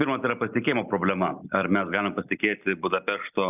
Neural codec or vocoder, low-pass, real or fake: none; 3.6 kHz; real